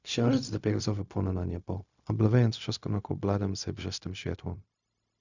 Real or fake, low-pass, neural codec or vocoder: fake; 7.2 kHz; codec, 16 kHz, 0.4 kbps, LongCat-Audio-Codec